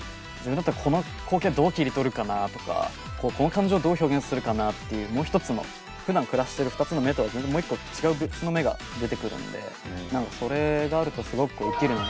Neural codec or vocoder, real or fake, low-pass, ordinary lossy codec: none; real; none; none